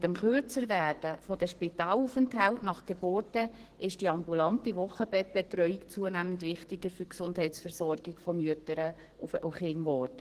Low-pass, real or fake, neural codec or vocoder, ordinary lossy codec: 14.4 kHz; fake; codec, 44.1 kHz, 2.6 kbps, SNAC; Opus, 16 kbps